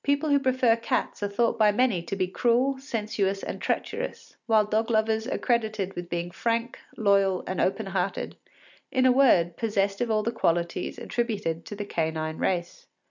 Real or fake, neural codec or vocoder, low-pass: real; none; 7.2 kHz